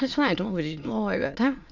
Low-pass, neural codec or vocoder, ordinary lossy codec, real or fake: 7.2 kHz; autoencoder, 22.05 kHz, a latent of 192 numbers a frame, VITS, trained on many speakers; none; fake